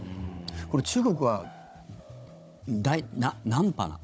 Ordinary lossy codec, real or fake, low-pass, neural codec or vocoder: none; fake; none; codec, 16 kHz, 16 kbps, FunCodec, trained on Chinese and English, 50 frames a second